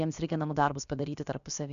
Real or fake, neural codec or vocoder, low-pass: fake; codec, 16 kHz, about 1 kbps, DyCAST, with the encoder's durations; 7.2 kHz